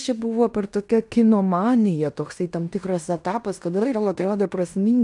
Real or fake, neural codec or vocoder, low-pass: fake; codec, 16 kHz in and 24 kHz out, 0.9 kbps, LongCat-Audio-Codec, fine tuned four codebook decoder; 10.8 kHz